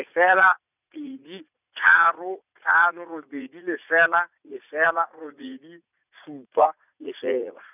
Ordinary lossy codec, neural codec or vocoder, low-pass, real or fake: none; vocoder, 44.1 kHz, 80 mel bands, Vocos; 3.6 kHz; fake